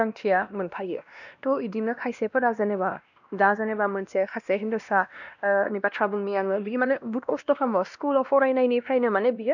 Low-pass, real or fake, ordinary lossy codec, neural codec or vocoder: 7.2 kHz; fake; none; codec, 16 kHz, 1 kbps, X-Codec, WavLM features, trained on Multilingual LibriSpeech